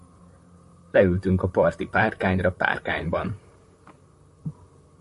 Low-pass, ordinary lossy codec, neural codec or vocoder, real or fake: 14.4 kHz; MP3, 48 kbps; vocoder, 44.1 kHz, 128 mel bands, Pupu-Vocoder; fake